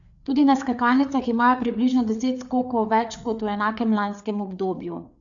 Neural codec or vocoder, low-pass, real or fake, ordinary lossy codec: codec, 16 kHz, 4 kbps, FreqCodec, larger model; 7.2 kHz; fake; none